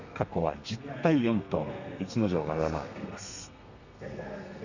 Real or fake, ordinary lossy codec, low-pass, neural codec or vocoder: fake; none; 7.2 kHz; codec, 32 kHz, 1.9 kbps, SNAC